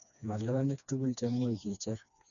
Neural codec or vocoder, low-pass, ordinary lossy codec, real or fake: codec, 16 kHz, 2 kbps, FreqCodec, smaller model; 7.2 kHz; none; fake